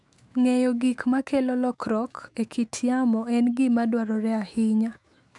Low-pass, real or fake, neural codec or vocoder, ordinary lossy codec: 10.8 kHz; fake; autoencoder, 48 kHz, 128 numbers a frame, DAC-VAE, trained on Japanese speech; none